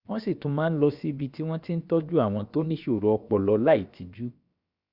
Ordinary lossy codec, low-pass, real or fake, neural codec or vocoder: Opus, 64 kbps; 5.4 kHz; fake; codec, 16 kHz, about 1 kbps, DyCAST, with the encoder's durations